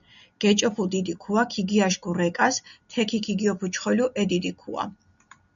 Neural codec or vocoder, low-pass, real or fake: none; 7.2 kHz; real